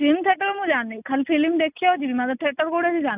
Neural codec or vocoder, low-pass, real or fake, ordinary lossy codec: none; 3.6 kHz; real; none